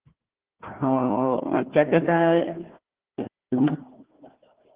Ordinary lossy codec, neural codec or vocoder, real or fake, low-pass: Opus, 16 kbps; codec, 16 kHz, 1 kbps, FunCodec, trained on Chinese and English, 50 frames a second; fake; 3.6 kHz